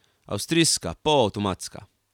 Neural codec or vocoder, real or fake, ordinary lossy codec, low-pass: none; real; none; 19.8 kHz